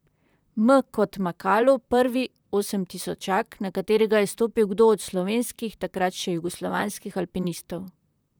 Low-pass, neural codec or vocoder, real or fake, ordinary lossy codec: none; vocoder, 44.1 kHz, 128 mel bands, Pupu-Vocoder; fake; none